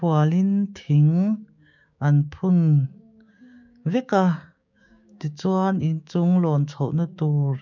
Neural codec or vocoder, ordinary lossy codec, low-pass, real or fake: codec, 16 kHz, 6 kbps, DAC; none; 7.2 kHz; fake